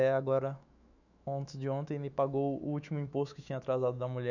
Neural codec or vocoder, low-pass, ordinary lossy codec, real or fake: autoencoder, 48 kHz, 128 numbers a frame, DAC-VAE, trained on Japanese speech; 7.2 kHz; none; fake